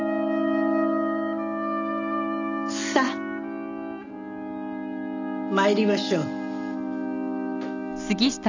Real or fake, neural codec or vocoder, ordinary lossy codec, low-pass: real; none; none; 7.2 kHz